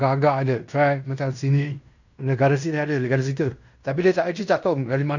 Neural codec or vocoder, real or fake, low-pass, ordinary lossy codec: codec, 16 kHz in and 24 kHz out, 0.9 kbps, LongCat-Audio-Codec, fine tuned four codebook decoder; fake; 7.2 kHz; none